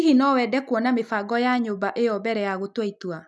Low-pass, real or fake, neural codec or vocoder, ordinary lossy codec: none; real; none; none